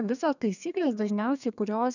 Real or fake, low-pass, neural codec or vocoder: fake; 7.2 kHz; codec, 32 kHz, 1.9 kbps, SNAC